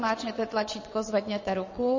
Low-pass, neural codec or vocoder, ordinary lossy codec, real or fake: 7.2 kHz; vocoder, 22.05 kHz, 80 mel bands, WaveNeXt; MP3, 32 kbps; fake